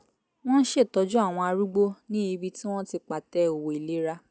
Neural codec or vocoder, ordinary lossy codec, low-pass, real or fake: none; none; none; real